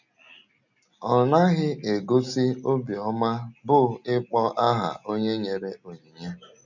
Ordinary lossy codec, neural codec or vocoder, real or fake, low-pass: none; none; real; 7.2 kHz